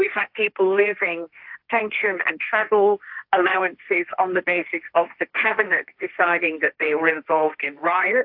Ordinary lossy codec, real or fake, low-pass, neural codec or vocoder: AAC, 48 kbps; fake; 5.4 kHz; codec, 16 kHz, 1.1 kbps, Voila-Tokenizer